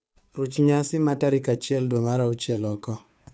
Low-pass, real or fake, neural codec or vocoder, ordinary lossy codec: none; fake; codec, 16 kHz, 2 kbps, FunCodec, trained on Chinese and English, 25 frames a second; none